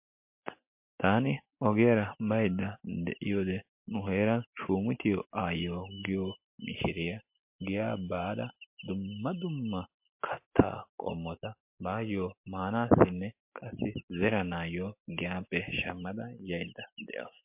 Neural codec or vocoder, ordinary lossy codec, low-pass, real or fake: none; MP3, 32 kbps; 3.6 kHz; real